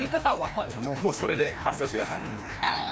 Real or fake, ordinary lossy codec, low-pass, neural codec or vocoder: fake; none; none; codec, 16 kHz, 1 kbps, FreqCodec, larger model